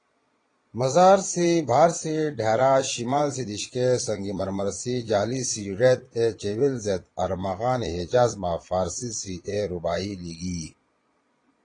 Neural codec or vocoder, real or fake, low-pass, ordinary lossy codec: vocoder, 22.05 kHz, 80 mel bands, Vocos; fake; 9.9 kHz; AAC, 32 kbps